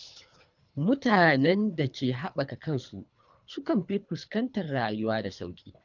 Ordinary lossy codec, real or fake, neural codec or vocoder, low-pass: none; fake; codec, 24 kHz, 3 kbps, HILCodec; 7.2 kHz